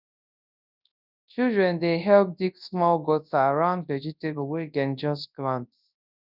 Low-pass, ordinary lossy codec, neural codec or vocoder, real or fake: 5.4 kHz; none; codec, 24 kHz, 0.9 kbps, WavTokenizer, large speech release; fake